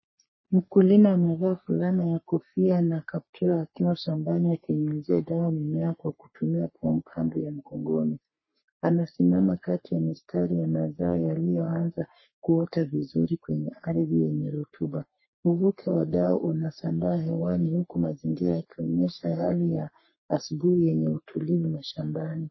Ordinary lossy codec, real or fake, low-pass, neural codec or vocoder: MP3, 24 kbps; fake; 7.2 kHz; codec, 44.1 kHz, 3.4 kbps, Pupu-Codec